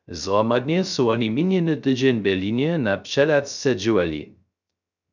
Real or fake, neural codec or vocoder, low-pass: fake; codec, 16 kHz, 0.3 kbps, FocalCodec; 7.2 kHz